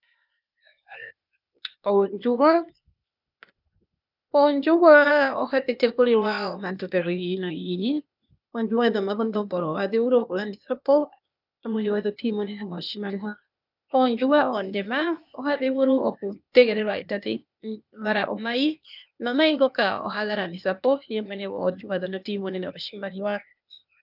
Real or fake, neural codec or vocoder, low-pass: fake; codec, 16 kHz, 0.8 kbps, ZipCodec; 5.4 kHz